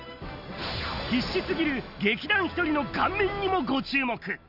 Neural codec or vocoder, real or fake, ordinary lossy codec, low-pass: none; real; none; 5.4 kHz